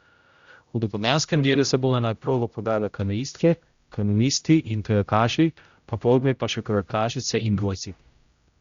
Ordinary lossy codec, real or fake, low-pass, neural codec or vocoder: Opus, 64 kbps; fake; 7.2 kHz; codec, 16 kHz, 0.5 kbps, X-Codec, HuBERT features, trained on general audio